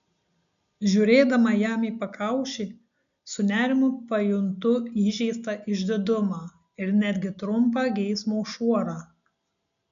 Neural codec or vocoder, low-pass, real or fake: none; 7.2 kHz; real